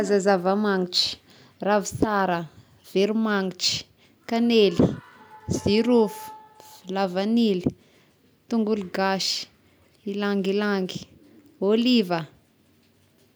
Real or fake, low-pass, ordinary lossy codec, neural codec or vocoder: real; none; none; none